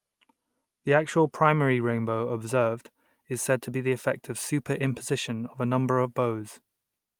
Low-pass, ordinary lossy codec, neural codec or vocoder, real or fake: 19.8 kHz; Opus, 32 kbps; none; real